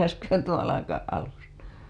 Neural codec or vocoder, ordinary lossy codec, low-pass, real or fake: none; none; none; real